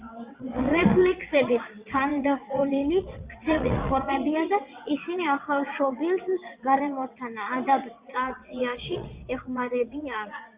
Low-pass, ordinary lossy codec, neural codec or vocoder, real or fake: 3.6 kHz; Opus, 64 kbps; vocoder, 22.05 kHz, 80 mel bands, WaveNeXt; fake